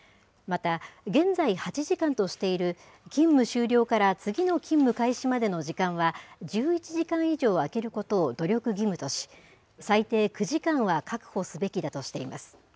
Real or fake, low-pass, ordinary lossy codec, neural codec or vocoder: real; none; none; none